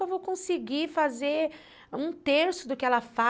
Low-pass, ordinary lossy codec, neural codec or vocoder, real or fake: none; none; none; real